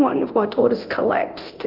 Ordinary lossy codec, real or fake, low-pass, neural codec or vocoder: Opus, 32 kbps; fake; 5.4 kHz; codec, 24 kHz, 0.9 kbps, DualCodec